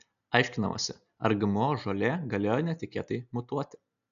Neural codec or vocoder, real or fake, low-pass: none; real; 7.2 kHz